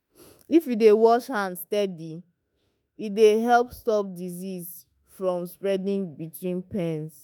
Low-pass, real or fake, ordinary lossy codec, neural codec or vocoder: none; fake; none; autoencoder, 48 kHz, 32 numbers a frame, DAC-VAE, trained on Japanese speech